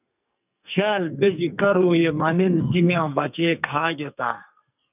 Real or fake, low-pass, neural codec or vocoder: fake; 3.6 kHz; codec, 44.1 kHz, 2.6 kbps, SNAC